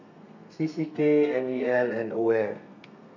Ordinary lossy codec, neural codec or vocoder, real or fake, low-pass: none; codec, 32 kHz, 1.9 kbps, SNAC; fake; 7.2 kHz